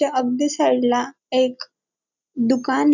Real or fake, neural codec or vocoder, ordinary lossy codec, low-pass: real; none; none; 7.2 kHz